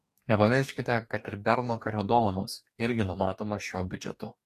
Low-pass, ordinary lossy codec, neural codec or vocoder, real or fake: 14.4 kHz; AAC, 64 kbps; codec, 44.1 kHz, 2.6 kbps, DAC; fake